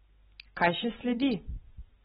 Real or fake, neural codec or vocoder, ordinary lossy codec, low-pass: real; none; AAC, 16 kbps; 19.8 kHz